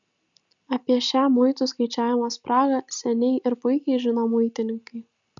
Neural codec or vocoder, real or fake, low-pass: none; real; 7.2 kHz